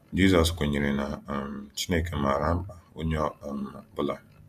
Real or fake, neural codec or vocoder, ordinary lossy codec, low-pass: fake; vocoder, 48 kHz, 128 mel bands, Vocos; MP3, 96 kbps; 14.4 kHz